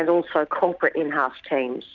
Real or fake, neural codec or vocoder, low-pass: real; none; 7.2 kHz